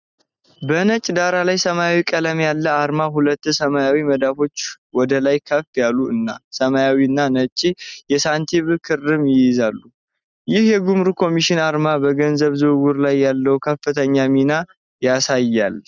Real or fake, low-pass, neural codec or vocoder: real; 7.2 kHz; none